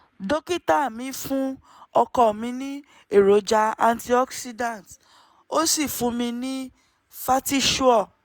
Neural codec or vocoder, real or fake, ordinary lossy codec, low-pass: none; real; none; none